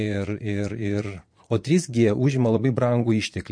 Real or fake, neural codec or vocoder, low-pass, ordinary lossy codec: fake; vocoder, 22.05 kHz, 80 mel bands, Vocos; 9.9 kHz; MP3, 48 kbps